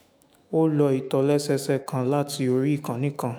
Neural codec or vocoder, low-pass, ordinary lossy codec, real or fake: autoencoder, 48 kHz, 128 numbers a frame, DAC-VAE, trained on Japanese speech; none; none; fake